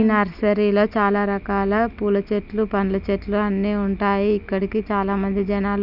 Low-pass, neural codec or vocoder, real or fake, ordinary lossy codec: 5.4 kHz; none; real; none